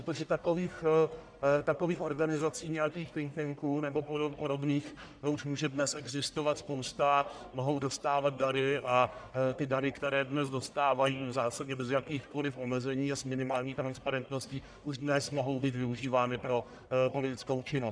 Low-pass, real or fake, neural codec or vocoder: 9.9 kHz; fake; codec, 44.1 kHz, 1.7 kbps, Pupu-Codec